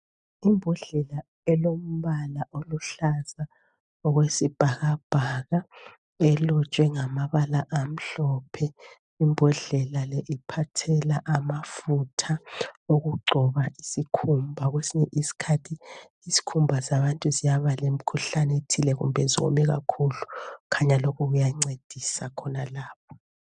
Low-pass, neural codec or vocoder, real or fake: 9.9 kHz; none; real